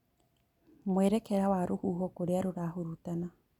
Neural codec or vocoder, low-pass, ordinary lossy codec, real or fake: none; 19.8 kHz; none; real